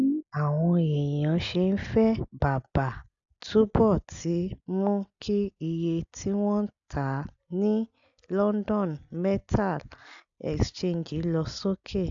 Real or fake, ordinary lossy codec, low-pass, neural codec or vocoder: real; AAC, 64 kbps; 7.2 kHz; none